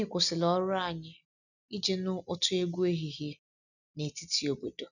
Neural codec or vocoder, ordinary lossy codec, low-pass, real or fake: none; none; 7.2 kHz; real